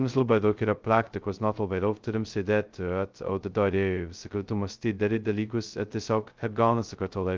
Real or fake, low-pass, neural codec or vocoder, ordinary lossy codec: fake; 7.2 kHz; codec, 16 kHz, 0.2 kbps, FocalCodec; Opus, 24 kbps